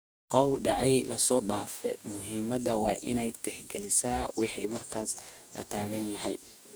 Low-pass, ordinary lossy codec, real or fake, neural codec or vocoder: none; none; fake; codec, 44.1 kHz, 2.6 kbps, DAC